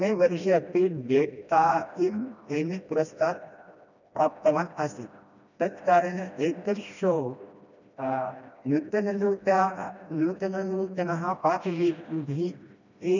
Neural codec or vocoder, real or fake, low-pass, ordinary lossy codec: codec, 16 kHz, 1 kbps, FreqCodec, smaller model; fake; 7.2 kHz; none